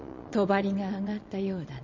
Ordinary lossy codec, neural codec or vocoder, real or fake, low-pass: MP3, 64 kbps; vocoder, 22.05 kHz, 80 mel bands, Vocos; fake; 7.2 kHz